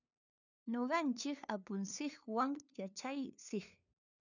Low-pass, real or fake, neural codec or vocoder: 7.2 kHz; fake; codec, 16 kHz, 2 kbps, FunCodec, trained on LibriTTS, 25 frames a second